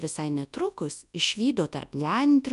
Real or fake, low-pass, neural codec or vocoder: fake; 10.8 kHz; codec, 24 kHz, 0.9 kbps, WavTokenizer, large speech release